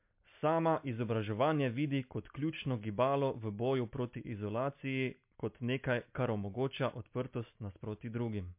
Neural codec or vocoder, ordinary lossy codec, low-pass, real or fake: none; MP3, 32 kbps; 3.6 kHz; real